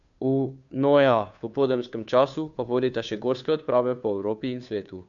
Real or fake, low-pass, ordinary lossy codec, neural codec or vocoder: fake; 7.2 kHz; none; codec, 16 kHz, 2 kbps, FunCodec, trained on Chinese and English, 25 frames a second